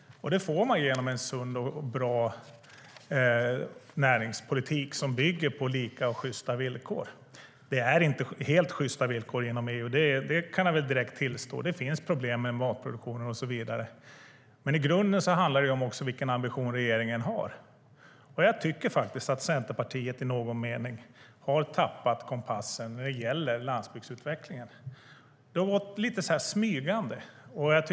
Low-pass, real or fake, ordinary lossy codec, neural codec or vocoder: none; real; none; none